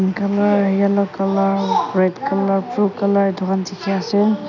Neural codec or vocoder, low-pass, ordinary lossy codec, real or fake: none; 7.2 kHz; none; real